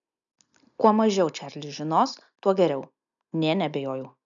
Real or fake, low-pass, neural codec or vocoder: real; 7.2 kHz; none